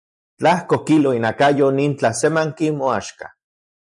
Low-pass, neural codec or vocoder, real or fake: 10.8 kHz; none; real